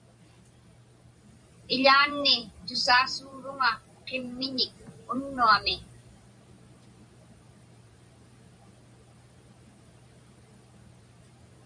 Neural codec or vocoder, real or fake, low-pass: vocoder, 44.1 kHz, 128 mel bands every 512 samples, BigVGAN v2; fake; 9.9 kHz